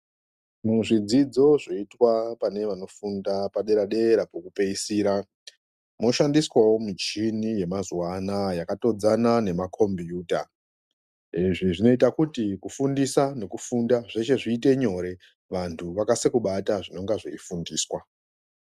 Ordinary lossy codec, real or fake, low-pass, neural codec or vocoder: Opus, 64 kbps; real; 14.4 kHz; none